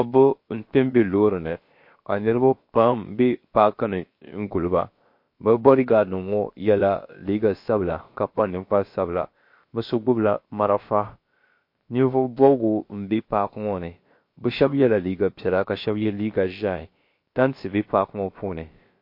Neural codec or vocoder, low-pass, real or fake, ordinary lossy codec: codec, 16 kHz, about 1 kbps, DyCAST, with the encoder's durations; 5.4 kHz; fake; MP3, 32 kbps